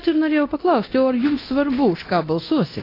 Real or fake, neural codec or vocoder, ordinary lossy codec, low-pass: fake; codec, 24 kHz, 0.9 kbps, DualCodec; AAC, 24 kbps; 5.4 kHz